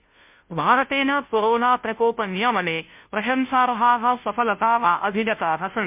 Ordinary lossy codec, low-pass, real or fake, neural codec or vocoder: MP3, 32 kbps; 3.6 kHz; fake; codec, 16 kHz, 0.5 kbps, FunCodec, trained on Chinese and English, 25 frames a second